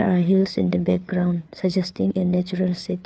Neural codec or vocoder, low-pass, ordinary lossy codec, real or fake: codec, 16 kHz, 4 kbps, FreqCodec, larger model; none; none; fake